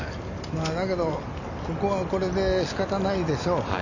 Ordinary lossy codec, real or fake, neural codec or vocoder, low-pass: none; real; none; 7.2 kHz